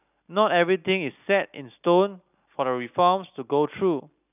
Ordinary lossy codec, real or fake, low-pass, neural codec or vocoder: none; real; 3.6 kHz; none